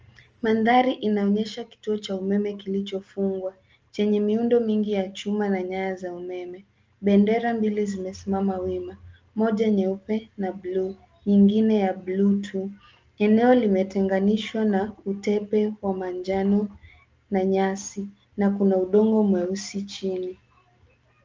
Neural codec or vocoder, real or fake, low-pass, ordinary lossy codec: none; real; 7.2 kHz; Opus, 24 kbps